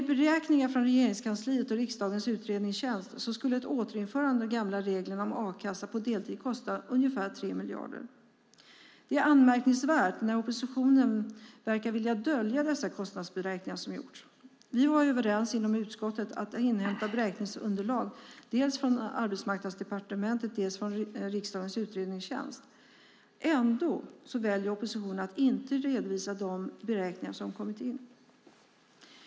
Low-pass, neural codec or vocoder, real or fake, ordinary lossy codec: none; none; real; none